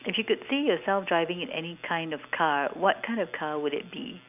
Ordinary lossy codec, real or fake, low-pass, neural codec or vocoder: none; real; 3.6 kHz; none